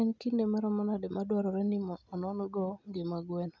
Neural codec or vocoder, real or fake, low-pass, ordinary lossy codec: none; real; 7.2 kHz; none